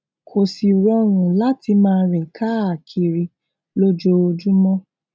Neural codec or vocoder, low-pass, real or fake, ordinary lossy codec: none; none; real; none